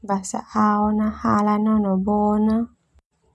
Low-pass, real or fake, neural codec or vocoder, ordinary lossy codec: none; real; none; none